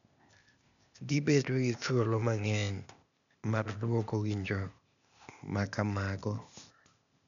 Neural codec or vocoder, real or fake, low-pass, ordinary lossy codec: codec, 16 kHz, 0.8 kbps, ZipCodec; fake; 7.2 kHz; none